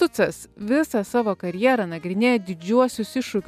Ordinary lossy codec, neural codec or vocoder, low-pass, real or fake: MP3, 96 kbps; none; 14.4 kHz; real